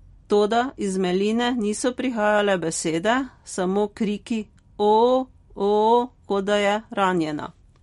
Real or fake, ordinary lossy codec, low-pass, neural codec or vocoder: real; MP3, 48 kbps; 19.8 kHz; none